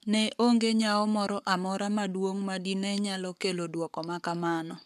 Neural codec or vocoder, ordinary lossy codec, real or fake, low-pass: codec, 44.1 kHz, 7.8 kbps, Pupu-Codec; none; fake; 14.4 kHz